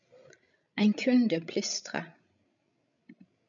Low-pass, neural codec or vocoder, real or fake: 7.2 kHz; codec, 16 kHz, 16 kbps, FreqCodec, larger model; fake